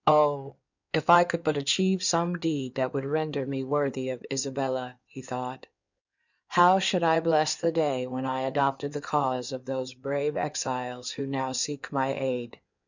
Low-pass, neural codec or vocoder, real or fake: 7.2 kHz; codec, 16 kHz in and 24 kHz out, 2.2 kbps, FireRedTTS-2 codec; fake